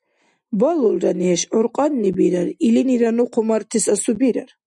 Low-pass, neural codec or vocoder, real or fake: 10.8 kHz; none; real